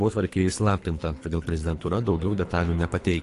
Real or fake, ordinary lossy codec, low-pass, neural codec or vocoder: fake; AAC, 48 kbps; 10.8 kHz; codec, 24 kHz, 3 kbps, HILCodec